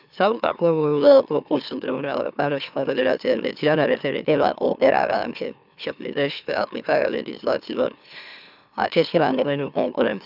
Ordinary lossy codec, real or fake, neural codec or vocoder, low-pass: none; fake; autoencoder, 44.1 kHz, a latent of 192 numbers a frame, MeloTTS; 5.4 kHz